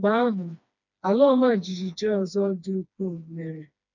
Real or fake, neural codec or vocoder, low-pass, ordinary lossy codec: fake; codec, 16 kHz, 2 kbps, FreqCodec, smaller model; 7.2 kHz; none